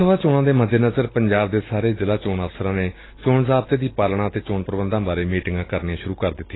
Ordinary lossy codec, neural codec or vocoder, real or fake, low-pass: AAC, 16 kbps; none; real; 7.2 kHz